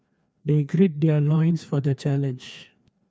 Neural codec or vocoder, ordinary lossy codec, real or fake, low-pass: codec, 16 kHz, 2 kbps, FreqCodec, larger model; none; fake; none